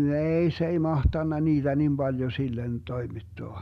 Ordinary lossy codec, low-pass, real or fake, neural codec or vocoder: none; 14.4 kHz; real; none